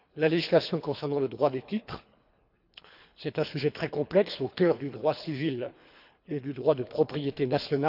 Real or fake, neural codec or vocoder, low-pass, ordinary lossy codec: fake; codec, 24 kHz, 3 kbps, HILCodec; 5.4 kHz; none